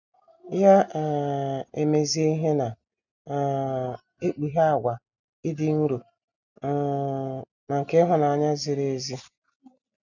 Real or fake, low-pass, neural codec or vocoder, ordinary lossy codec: real; 7.2 kHz; none; none